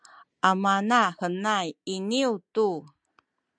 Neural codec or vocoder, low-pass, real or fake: none; 9.9 kHz; real